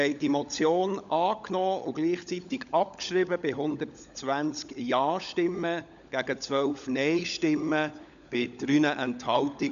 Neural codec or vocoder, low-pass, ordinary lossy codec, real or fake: codec, 16 kHz, 16 kbps, FunCodec, trained on LibriTTS, 50 frames a second; 7.2 kHz; none; fake